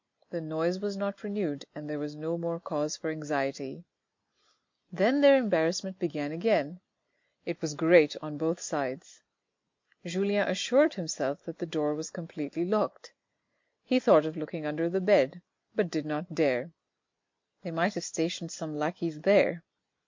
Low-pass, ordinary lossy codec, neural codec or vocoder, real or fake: 7.2 kHz; MP3, 48 kbps; none; real